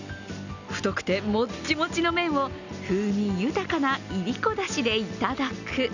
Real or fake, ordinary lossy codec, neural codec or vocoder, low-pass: real; none; none; 7.2 kHz